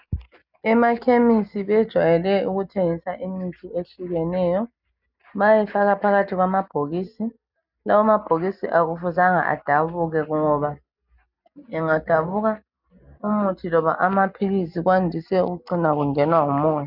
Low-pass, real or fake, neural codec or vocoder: 5.4 kHz; real; none